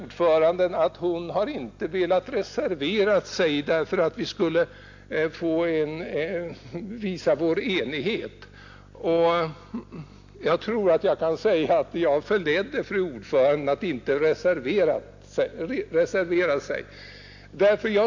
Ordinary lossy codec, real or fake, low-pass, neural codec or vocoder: AAC, 48 kbps; real; 7.2 kHz; none